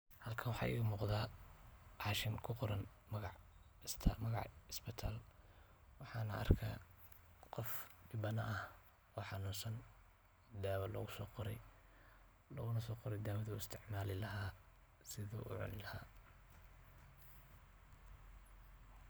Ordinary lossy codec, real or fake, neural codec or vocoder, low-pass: none; real; none; none